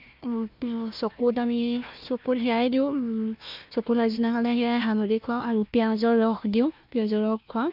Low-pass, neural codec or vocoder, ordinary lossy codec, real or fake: 5.4 kHz; codec, 16 kHz, 1 kbps, FunCodec, trained on Chinese and English, 50 frames a second; MP3, 48 kbps; fake